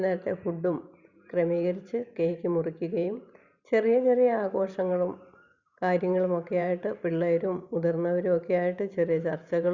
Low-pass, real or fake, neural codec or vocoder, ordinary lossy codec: 7.2 kHz; fake; vocoder, 44.1 kHz, 128 mel bands every 512 samples, BigVGAN v2; Opus, 64 kbps